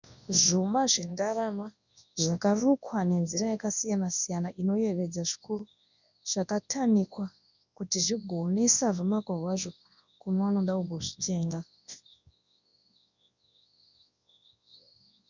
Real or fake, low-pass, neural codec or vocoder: fake; 7.2 kHz; codec, 24 kHz, 0.9 kbps, WavTokenizer, large speech release